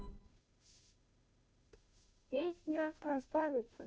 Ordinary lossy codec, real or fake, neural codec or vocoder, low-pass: none; fake; codec, 16 kHz, 0.5 kbps, FunCodec, trained on Chinese and English, 25 frames a second; none